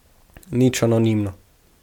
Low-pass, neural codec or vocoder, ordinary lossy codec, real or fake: 19.8 kHz; none; MP3, 96 kbps; real